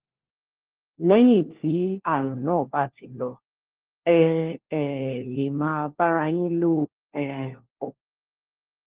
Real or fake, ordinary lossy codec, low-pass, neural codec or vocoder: fake; Opus, 16 kbps; 3.6 kHz; codec, 16 kHz, 1 kbps, FunCodec, trained on LibriTTS, 50 frames a second